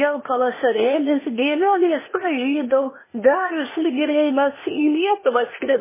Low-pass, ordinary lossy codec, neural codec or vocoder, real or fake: 3.6 kHz; MP3, 16 kbps; codec, 16 kHz, 0.8 kbps, ZipCodec; fake